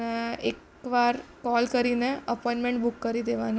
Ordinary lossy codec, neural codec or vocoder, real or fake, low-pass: none; none; real; none